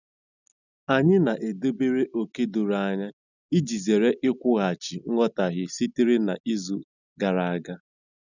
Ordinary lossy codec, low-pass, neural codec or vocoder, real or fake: none; 7.2 kHz; none; real